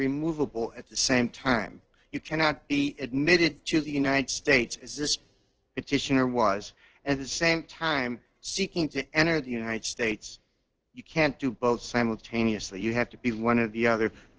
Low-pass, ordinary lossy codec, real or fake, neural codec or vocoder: 7.2 kHz; Opus, 16 kbps; fake; codec, 16 kHz in and 24 kHz out, 1 kbps, XY-Tokenizer